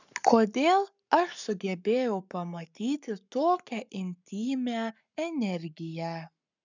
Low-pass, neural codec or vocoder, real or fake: 7.2 kHz; codec, 44.1 kHz, 7.8 kbps, Pupu-Codec; fake